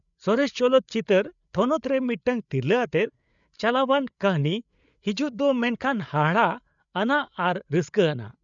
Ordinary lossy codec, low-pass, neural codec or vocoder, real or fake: none; 7.2 kHz; codec, 16 kHz, 8 kbps, FreqCodec, larger model; fake